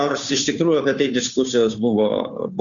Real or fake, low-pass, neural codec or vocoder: fake; 7.2 kHz; codec, 16 kHz, 2 kbps, FunCodec, trained on Chinese and English, 25 frames a second